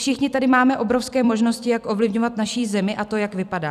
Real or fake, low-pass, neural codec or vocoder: fake; 14.4 kHz; vocoder, 44.1 kHz, 128 mel bands every 256 samples, BigVGAN v2